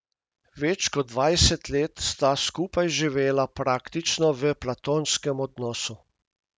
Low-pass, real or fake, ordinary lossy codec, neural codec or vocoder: none; real; none; none